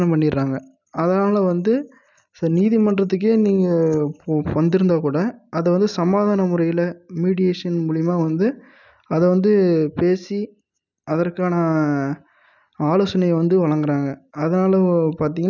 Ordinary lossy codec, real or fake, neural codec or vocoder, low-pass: none; real; none; 7.2 kHz